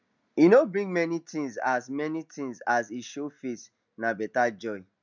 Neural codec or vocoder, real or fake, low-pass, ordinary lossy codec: none; real; 7.2 kHz; none